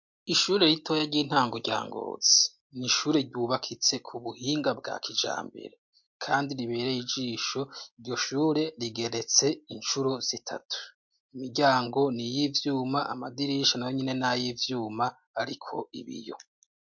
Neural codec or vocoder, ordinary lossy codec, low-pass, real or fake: none; MP3, 48 kbps; 7.2 kHz; real